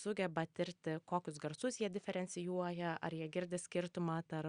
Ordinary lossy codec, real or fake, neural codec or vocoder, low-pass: Opus, 64 kbps; real; none; 9.9 kHz